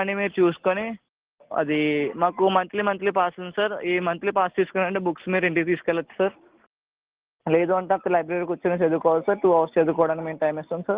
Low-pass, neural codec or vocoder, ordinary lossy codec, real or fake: 3.6 kHz; none; Opus, 16 kbps; real